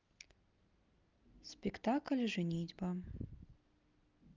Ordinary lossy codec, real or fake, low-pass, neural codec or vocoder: Opus, 24 kbps; real; 7.2 kHz; none